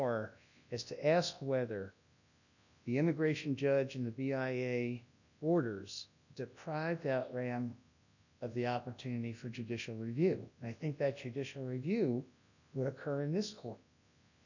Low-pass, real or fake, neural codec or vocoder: 7.2 kHz; fake; codec, 24 kHz, 0.9 kbps, WavTokenizer, large speech release